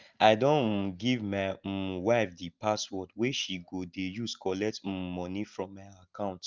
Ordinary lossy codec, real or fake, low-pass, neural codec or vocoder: Opus, 32 kbps; real; 7.2 kHz; none